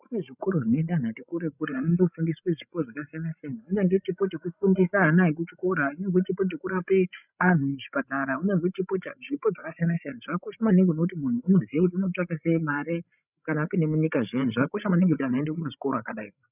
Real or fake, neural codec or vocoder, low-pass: fake; codec, 16 kHz, 16 kbps, FreqCodec, larger model; 3.6 kHz